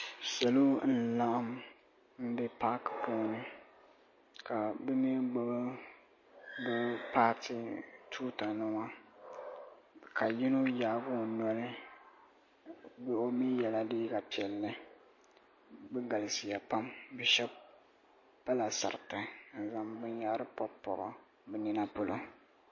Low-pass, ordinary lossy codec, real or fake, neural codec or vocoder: 7.2 kHz; MP3, 32 kbps; real; none